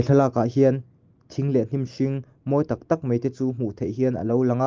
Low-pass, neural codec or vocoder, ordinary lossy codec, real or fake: 7.2 kHz; none; Opus, 32 kbps; real